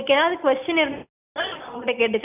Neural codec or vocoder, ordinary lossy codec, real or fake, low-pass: none; none; real; 3.6 kHz